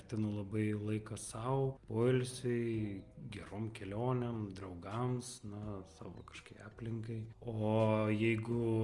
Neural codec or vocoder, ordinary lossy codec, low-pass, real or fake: none; Opus, 32 kbps; 10.8 kHz; real